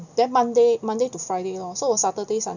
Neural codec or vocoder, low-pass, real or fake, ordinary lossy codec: none; 7.2 kHz; real; none